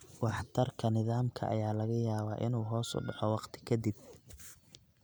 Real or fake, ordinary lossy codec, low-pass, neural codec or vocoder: real; none; none; none